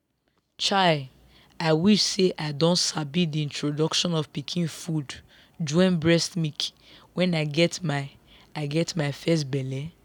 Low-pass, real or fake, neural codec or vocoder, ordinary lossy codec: 19.8 kHz; real; none; none